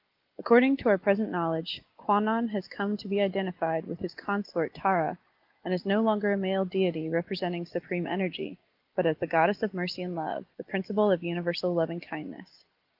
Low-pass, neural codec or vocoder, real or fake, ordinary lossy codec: 5.4 kHz; none; real; Opus, 24 kbps